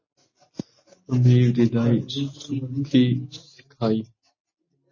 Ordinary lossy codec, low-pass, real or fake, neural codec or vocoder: MP3, 32 kbps; 7.2 kHz; real; none